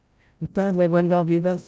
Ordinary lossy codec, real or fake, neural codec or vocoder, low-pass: none; fake; codec, 16 kHz, 0.5 kbps, FreqCodec, larger model; none